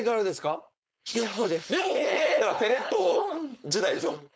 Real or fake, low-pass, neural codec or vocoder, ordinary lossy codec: fake; none; codec, 16 kHz, 4.8 kbps, FACodec; none